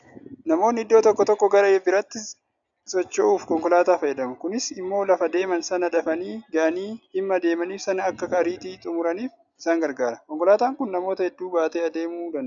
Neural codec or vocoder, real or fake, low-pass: none; real; 7.2 kHz